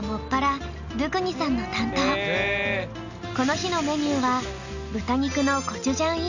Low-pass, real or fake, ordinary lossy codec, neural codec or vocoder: 7.2 kHz; real; none; none